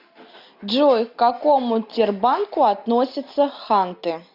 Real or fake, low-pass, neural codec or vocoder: real; 5.4 kHz; none